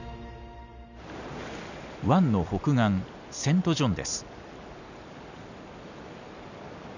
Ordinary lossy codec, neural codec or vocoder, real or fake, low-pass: none; none; real; 7.2 kHz